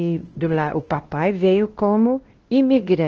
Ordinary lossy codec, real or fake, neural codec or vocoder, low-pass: Opus, 16 kbps; fake; codec, 16 kHz, 1 kbps, X-Codec, WavLM features, trained on Multilingual LibriSpeech; 7.2 kHz